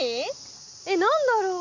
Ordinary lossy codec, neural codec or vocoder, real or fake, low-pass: none; none; real; 7.2 kHz